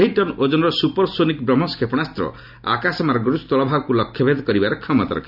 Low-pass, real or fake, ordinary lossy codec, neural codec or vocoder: 5.4 kHz; real; none; none